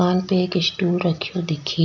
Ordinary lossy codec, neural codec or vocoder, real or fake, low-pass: none; none; real; 7.2 kHz